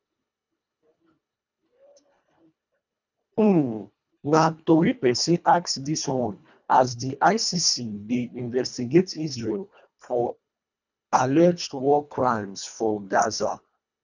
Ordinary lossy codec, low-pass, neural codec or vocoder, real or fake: none; 7.2 kHz; codec, 24 kHz, 1.5 kbps, HILCodec; fake